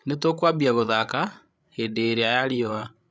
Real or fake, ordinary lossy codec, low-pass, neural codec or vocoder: fake; none; none; codec, 16 kHz, 16 kbps, FreqCodec, larger model